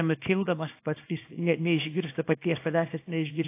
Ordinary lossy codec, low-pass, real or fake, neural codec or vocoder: AAC, 24 kbps; 3.6 kHz; fake; codec, 24 kHz, 0.9 kbps, WavTokenizer, small release